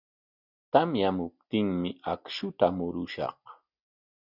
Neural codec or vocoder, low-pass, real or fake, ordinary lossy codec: none; 5.4 kHz; real; Opus, 64 kbps